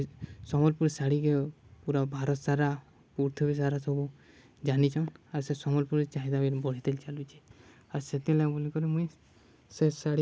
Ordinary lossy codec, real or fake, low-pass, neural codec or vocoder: none; real; none; none